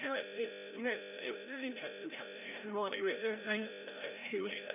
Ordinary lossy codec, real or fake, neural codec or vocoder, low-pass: none; fake; codec, 16 kHz, 0.5 kbps, FreqCodec, larger model; 3.6 kHz